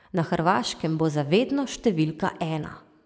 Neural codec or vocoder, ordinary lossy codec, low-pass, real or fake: none; none; none; real